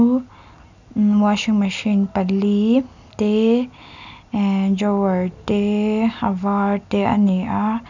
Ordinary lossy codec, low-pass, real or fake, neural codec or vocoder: none; 7.2 kHz; real; none